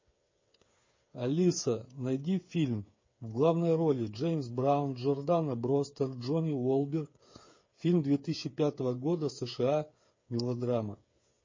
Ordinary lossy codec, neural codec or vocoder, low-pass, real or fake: MP3, 32 kbps; codec, 16 kHz, 8 kbps, FreqCodec, smaller model; 7.2 kHz; fake